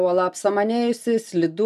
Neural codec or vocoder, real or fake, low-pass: none; real; 14.4 kHz